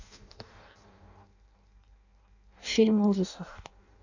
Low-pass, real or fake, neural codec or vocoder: 7.2 kHz; fake; codec, 16 kHz in and 24 kHz out, 0.6 kbps, FireRedTTS-2 codec